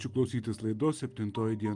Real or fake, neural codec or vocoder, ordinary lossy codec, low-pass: real; none; Opus, 32 kbps; 10.8 kHz